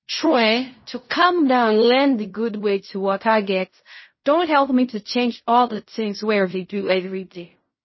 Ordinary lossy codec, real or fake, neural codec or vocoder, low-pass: MP3, 24 kbps; fake; codec, 16 kHz in and 24 kHz out, 0.4 kbps, LongCat-Audio-Codec, fine tuned four codebook decoder; 7.2 kHz